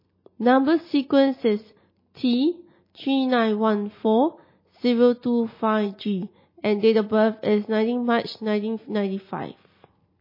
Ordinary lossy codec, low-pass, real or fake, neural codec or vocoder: MP3, 24 kbps; 5.4 kHz; real; none